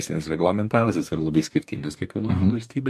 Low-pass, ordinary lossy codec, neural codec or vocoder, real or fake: 14.4 kHz; MP3, 64 kbps; codec, 44.1 kHz, 2.6 kbps, DAC; fake